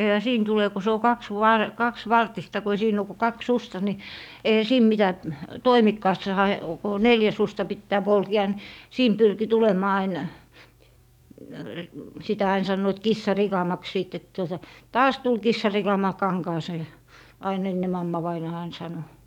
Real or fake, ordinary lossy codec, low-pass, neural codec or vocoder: fake; none; 19.8 kHz; codec, 44.1 kHz, 7.8 kbps, DAC